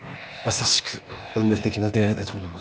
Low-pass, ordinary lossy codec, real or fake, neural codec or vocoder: none; none; fake; codec, 16 kHz, 0.8 kbps, ZipCodec